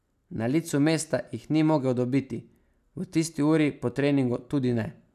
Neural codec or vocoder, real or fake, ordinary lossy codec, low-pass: none; real; none; 14.4 kHz